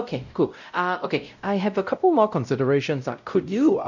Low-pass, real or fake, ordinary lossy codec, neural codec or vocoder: 7.2 kHz; fake; none; codec, 16 kHz, 0.5 kbps, X-Codec, WavLM features, trained on Multilingual LibriSpeech